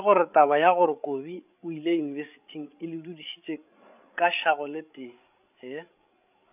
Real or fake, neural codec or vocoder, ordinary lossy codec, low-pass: fake; codec, 16 kHz, 16 kbps, FreqCodec, larger model; none; 3.6 kHz